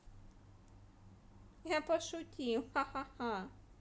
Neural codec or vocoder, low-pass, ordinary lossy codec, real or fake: none; none; none; real